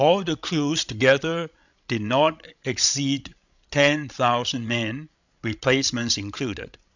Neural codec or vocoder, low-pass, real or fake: codec, 16 kHz, 16 kbps, FreqCodec, larger model; 7.2 kHz; fake